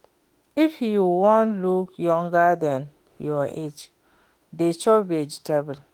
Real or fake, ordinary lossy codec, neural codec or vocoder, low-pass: fake; Opus, 24 kbps; autoencoder, 48 kHz, 32 numbers a frame, DAC-VAE, trained on Japanese speech; 19.8 kHz